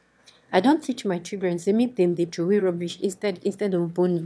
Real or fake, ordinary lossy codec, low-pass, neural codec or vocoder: fake; none; none; autoencoder, 22.05 kHz, a latent of 192 numbers a frame, VITS, trained on one speaker